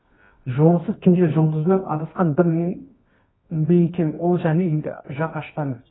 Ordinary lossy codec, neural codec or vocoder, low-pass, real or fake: AAC, 16 kbps; codec, 24 kHz, 0.9 kbps, WavTokenizer, medium music audio release; 7.2 kHz; fake